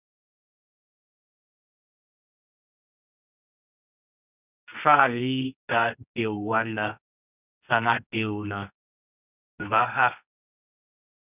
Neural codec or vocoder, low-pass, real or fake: codec, 24 kHz, 0.9 kbps, WavTokenizer, medium music audio release; 3.6 kHz; fake